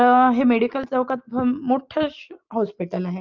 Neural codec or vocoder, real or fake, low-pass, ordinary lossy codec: none; real; 7.2 kHz; Opus, 24 kbps